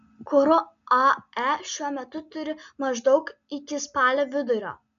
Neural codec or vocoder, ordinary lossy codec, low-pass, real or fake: none; MP3, 96 kbps; 7.2 kHz; real